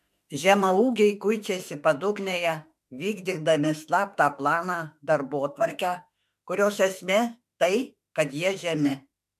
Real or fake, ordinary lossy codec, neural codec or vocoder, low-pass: fake; MP3, 96 kbps; autoencoder, 48 kHz, 32 numbers a frame, DAC-VAE, trained on Japanese speech; 14.4 kHz